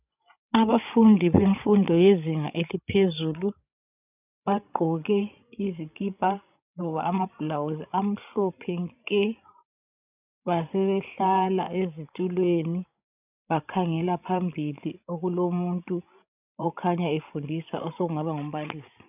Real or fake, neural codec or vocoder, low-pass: fake; codec, 16 kHz, 16 kbps, FreqCodec, larger model; 3.6 kHz